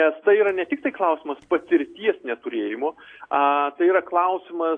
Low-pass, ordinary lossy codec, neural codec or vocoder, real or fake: 9.9 kHz; AAC, 48 kbps; none; real